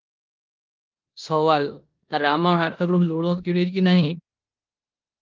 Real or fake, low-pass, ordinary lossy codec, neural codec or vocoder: fake; 7.2 kHz; Opus, 24 kbps; codec, 16 kHz in and 24 kHz out, 0.9 kbps, LongCat-Audio-Codec, four codebook decoder